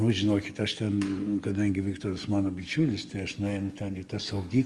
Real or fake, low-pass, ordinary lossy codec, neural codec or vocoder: fake; 10.8 kHz; Opus, 32 kbps; codec, 44.1 kHz, 7.8 kbps, DAC